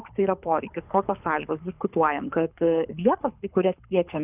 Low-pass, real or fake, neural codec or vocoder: 3.6 kHz; fake; codec, 24 kHz, 6 kbps, HILCodec